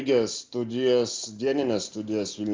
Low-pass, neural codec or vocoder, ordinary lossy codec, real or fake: 7.2 kHz; none; Opus, 24 kbps; real